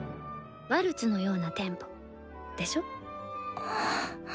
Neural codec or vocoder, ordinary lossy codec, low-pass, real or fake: none; none; none; real